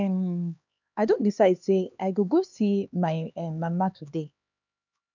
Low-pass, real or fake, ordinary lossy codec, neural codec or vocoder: 7.2 kHz; fake; none; codec, 16 kHz, 2 kbps, X-Codec, HuBERT features, trained on LibriSpeech